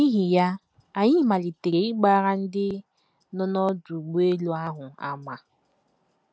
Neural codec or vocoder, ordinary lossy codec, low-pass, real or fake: none; none; none; real